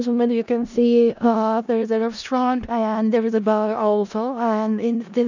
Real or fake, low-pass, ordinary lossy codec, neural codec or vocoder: fake; 7.2 kHz; none; codec, 16 kHz in and 24 kHz out, 0.4 kbps, LongCat-Audio-Codec, four codebook decoder